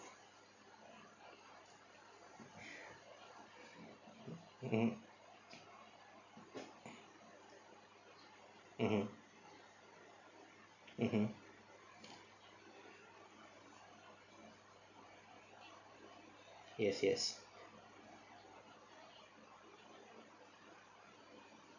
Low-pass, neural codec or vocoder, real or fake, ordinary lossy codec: 7.2 kHz; none; real; none